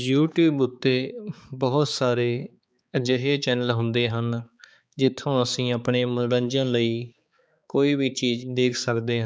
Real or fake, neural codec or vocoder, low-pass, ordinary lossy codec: fake; codec, 16 kHz, 4 kbps, X-Codec, HuBERT features, trained on balanced general audio; none; none